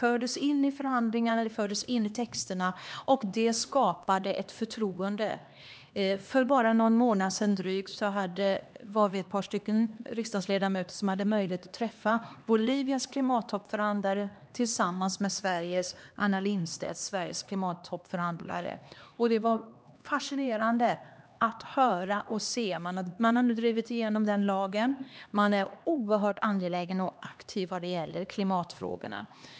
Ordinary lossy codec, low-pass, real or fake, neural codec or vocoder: none; none; fake; codec, 16 kHz, 2 kbps, X-Codec, HuBERT features, trained on LibriSpeech